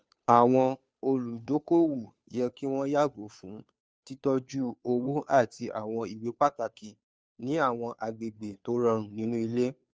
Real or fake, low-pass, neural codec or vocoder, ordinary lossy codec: fake; none; codec, 16 kHz, 2 kbps, FunCodec, trained on Chinese and English, 25 frames a second; none